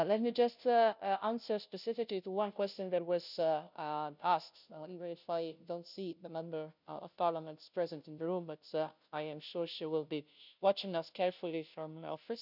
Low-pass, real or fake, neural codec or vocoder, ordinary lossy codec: 5.4 kHz; fake; codec, 16 kHz, 0.5 kbps, FunCodec, trained on Chinese and English, 25 frames a second; none